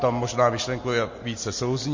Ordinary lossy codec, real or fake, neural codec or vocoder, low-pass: MP3, 32 kbps; real; none; 7.2 kHz